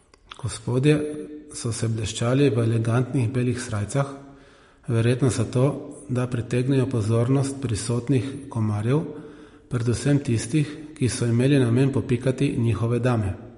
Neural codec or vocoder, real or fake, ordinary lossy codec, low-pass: none; real; MP3, 48 kbps; 10.8 kHz